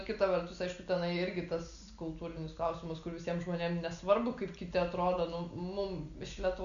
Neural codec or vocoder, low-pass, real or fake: none; 7.2 kHz; real